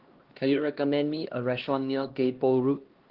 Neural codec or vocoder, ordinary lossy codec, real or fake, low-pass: codec, 16 kHz, 1 kbps, X-Codec, HuBERT features, trained on LibriSpeech; Opus, 16 kbps; fake; 5.4 kHz